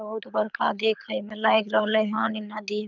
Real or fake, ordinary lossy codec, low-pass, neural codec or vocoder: fake; none; 7.2 kHz; codec, 24 kHz, 6 kbps, HILCodec